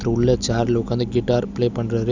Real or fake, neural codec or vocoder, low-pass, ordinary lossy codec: real; none; 7.2 kHz; none